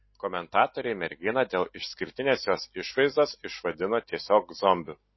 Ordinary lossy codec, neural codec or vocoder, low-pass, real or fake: MP3, 24 kbps; none; 7.2 kHz; real